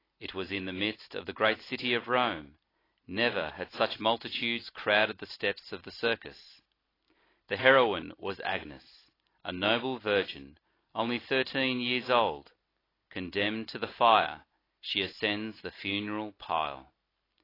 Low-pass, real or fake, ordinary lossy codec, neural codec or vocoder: 5.4 kHz; real; AAC, 24 kbps; none